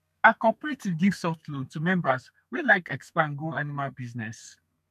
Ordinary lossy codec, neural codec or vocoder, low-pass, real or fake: none; codec, 32 kHz, 1.9 kbps, SNAC; 14.4 kHz; fake